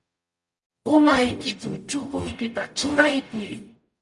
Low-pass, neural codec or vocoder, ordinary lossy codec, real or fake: 10.8 kHz; codec, 44.1 kHz, 0.9 kbps, DAC; Opus, 64 kbps; fake